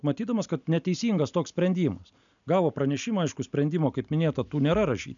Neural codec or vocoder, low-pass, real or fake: none; 7.2 kHz; real